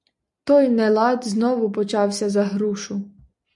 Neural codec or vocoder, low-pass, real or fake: none; 10.8 kHz; real